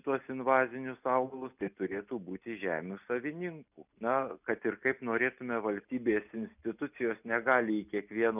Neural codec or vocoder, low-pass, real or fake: none; 3.6 kHz; real